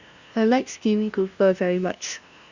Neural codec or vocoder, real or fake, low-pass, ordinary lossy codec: codec, 16 kHz, 0.5 kbps, FunCodec, trained on LibriTTS, 25 frames a second; fake; 7.2 kHz; Opus, 64 kbps